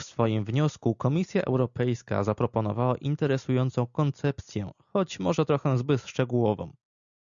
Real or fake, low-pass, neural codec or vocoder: real; 7.2 kHz; none